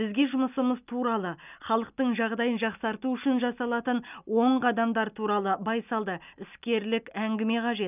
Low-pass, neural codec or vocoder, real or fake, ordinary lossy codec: 3.6 kHz; none; real; none